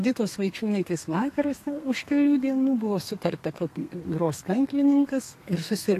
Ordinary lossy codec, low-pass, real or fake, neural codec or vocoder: AAC, 64 kbps; 14.4 kHz; fake; codec, 32 kHz, 1.9 kbps, SNAC